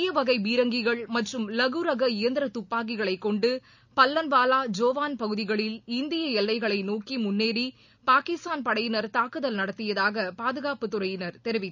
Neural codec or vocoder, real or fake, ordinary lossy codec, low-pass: none; real; none; 7.2 kHz